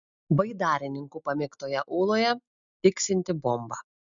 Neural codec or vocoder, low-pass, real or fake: none; 7.2 kHz; real